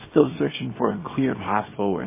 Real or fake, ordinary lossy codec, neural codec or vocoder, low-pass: fake; MP3, 16 kbps; codec, 24 kHz, 0.9 kbps, WavTokenizer, small release; 3.6 kHz